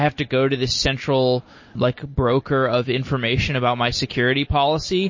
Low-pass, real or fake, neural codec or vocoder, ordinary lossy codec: 7.2 kHz; real; none; MP3, 32 kbps